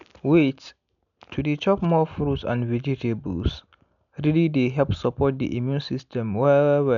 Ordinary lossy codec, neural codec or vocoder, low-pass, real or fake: none; none; 7.2 kHz; real